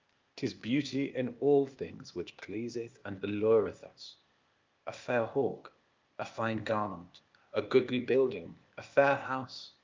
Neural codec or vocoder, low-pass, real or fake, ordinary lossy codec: codec, 16 kHz, 0.8 kbps, ZipCodec; 7.2 kHz; fake; Opus, 24 kbps